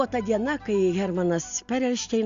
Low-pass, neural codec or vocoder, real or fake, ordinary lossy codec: 7.2 kHz; none; real; Opus, 64 kbps